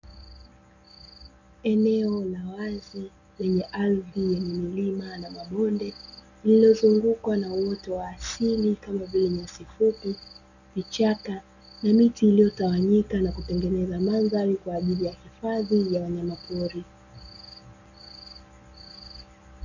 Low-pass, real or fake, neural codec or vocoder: 7.2 kHz; real; none